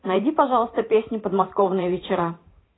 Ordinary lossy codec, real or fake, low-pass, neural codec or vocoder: AAC, 16 kbps; fake; 7.2 kHz; vocoder, 44.1 kHz, 128 mel bands every 512 samples, BigVGAN v2